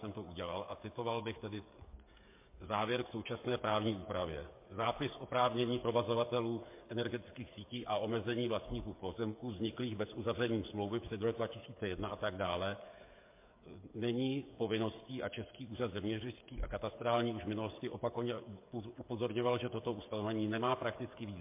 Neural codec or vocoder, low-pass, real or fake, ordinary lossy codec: codec, 16 kHz, 8 kbps, FreqCodec, smaller model; 3.6 kHz; fake; MP3, 24 kbps